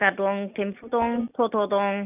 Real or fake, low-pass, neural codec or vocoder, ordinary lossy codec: real; 3.6 kHz; none; none